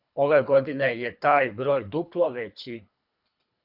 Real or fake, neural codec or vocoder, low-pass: fake; codec, 24 kHz, 3 kbps, HILCodec; 5.4 kHz